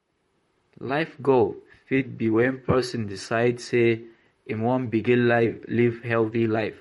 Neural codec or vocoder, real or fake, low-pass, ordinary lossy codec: vocoder, 44.1 kHz, 128 mel bands, Pupu-Vocoder; fake; 19.8 kHz; MP3, 48 kbps